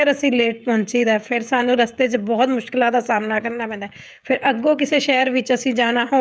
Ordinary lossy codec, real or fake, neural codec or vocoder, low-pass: none; fake; codec, 16 kHz, 16 kbps, FreqCodec, smaller model; none